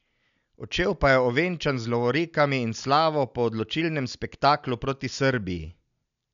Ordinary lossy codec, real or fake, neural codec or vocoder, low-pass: none; real; none; 7.2 kHz